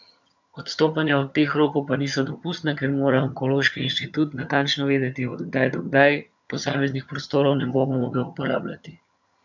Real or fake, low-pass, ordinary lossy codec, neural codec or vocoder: fake; 7.2 kHz; MP3, 64 kbps; vocoder, 22.05 kHz, 80 mel bands, HiFi-GAN